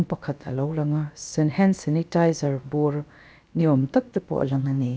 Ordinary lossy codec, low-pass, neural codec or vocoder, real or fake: none; none; codec, 16 kHz, about 1 kbps, DyCAST, with the encoder's durations; fake